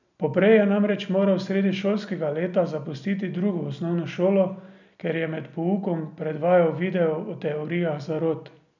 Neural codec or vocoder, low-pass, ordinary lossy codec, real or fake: none; 7.2 kHz; none; real